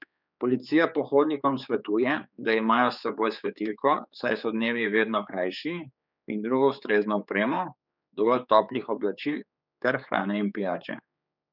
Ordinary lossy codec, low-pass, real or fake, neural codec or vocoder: none; 5.4 kHz; fake; codec, 16 kHz, 4 kbps, X-Codec, HuBERT features, trained on general audio